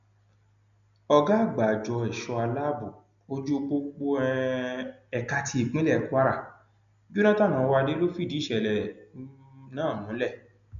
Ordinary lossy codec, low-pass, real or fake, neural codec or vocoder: none; 7.2 kHz; real; none